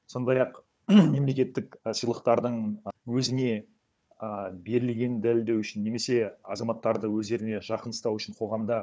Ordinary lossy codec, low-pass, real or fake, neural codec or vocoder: none; none; fake; codec, 16 kHz, 4 kbps, FunCodec, trained on Chinese and English, 50 frames a second